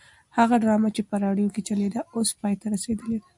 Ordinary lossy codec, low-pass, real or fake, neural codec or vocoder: MP3, 96 kbps; 10.8 kHz; real; none